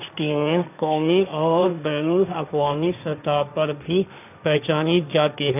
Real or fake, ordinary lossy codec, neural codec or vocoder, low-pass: fake; none; codec, 16 kHz, 1.1 kbps, Voila-Tokenizer; 3.6 kHz